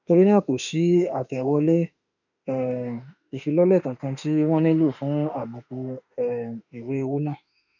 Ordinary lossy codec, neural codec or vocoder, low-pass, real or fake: none; autoencoder, 48 kHz, 32 numbers a frame, DAC-VAE, trained on Japanese speech; 7.2 kHz; fake